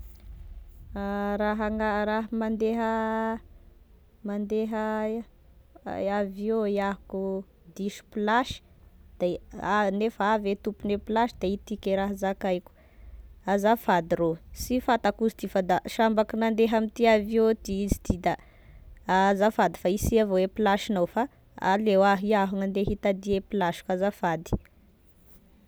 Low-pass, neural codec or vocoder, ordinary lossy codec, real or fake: none; none; none; real